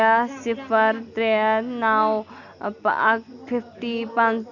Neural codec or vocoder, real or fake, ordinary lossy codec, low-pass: none; real; none; 7.2 kHz